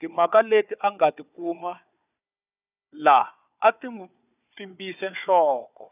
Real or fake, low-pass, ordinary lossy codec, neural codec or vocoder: fake; 3.6 kHz; none; codec, 16 kHz, 4 kbps, FunCodec, trained on Chinese and English, 50 frames a second